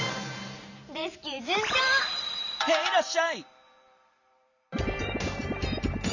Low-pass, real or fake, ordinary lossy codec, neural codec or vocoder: 7.2 kHz; fake; none; vocoder, 44.1 kHz, 128 mel bands every 512 samples, BigVGAN v2